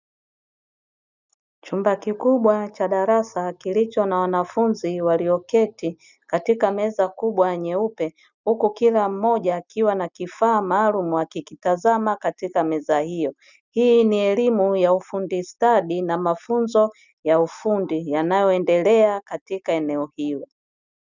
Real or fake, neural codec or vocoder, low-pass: real; none; 7.2 kHz